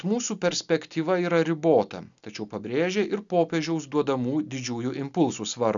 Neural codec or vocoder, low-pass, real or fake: none; 7.2 kHz; real